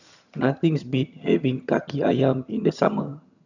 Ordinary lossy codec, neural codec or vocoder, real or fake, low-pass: none; vocoder, 22.05 kHz, 80 mel bands, HiFi-GAN; fake; 7.2 kHz